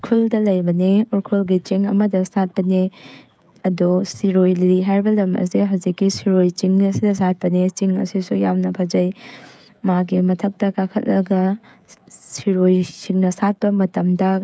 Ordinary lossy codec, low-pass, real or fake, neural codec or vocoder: none; none; fake; codec, 16 kHz, 16 kbps, FreqCodec, smaller model